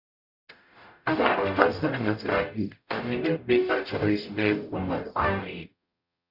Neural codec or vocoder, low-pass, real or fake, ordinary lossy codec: codec, 44.1 kHz, 0.9 kbps, DAC; 5.4 kHz; fake; none